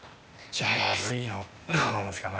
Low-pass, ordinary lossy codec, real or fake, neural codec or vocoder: none; none; fake; codec, 16 kHz, 0.8 kbps, ZipCodec